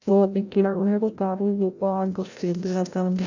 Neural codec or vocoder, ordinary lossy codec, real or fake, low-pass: codec, 16 kHz, 0.5 kbps, FreqCodec, larger model; none; fake; 7.2 kHz